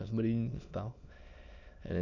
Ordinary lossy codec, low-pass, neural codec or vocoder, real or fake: none; 7.2 kHz; autoencoder, 22.05 kHz, a latent of 192 numbers a frame, VITS, trained on many speakers; fake